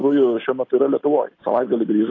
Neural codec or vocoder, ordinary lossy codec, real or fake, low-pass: none; AAC, 32 kbps; real; 7.2 kHz